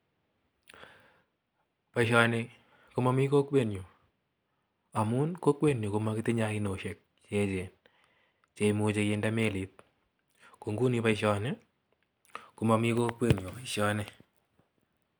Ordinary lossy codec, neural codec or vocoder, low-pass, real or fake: none; none; none; real